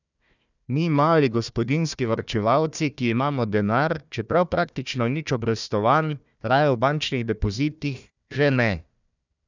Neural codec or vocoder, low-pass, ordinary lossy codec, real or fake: codec, 16 kHz, 1 kbps, FunCodec, trained on Chinese and English, 50 frames a second; 7.2 kHz; none; fake